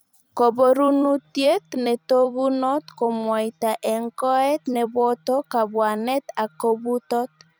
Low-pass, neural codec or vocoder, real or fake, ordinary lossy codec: none; vocoder, 44.1 kHz, 128 mel bands every 256 samples, BigVGAN v2; fake; none